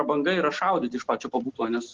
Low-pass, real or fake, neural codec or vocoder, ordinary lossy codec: 7.2 kHz; real; none; Opus, 16 kbps